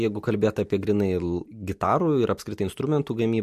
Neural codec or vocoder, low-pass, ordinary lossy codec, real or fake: none; 14.4 kHz; MP3, 64 kbps; real